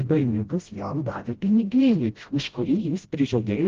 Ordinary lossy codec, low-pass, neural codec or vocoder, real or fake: Opus, 16 kbps; 7.2 kHz; codec, 16 kHz, 0.5 kbps, FreqCodec, smaller model; fake